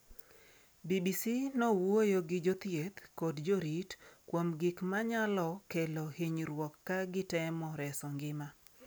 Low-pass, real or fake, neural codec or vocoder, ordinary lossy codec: none; real; none; none